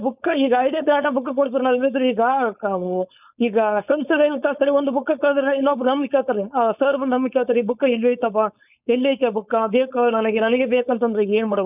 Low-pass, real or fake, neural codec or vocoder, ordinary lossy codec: 3.6 kHz; fake; codec, 16 kHz, 4.8 kbps, FACodec; none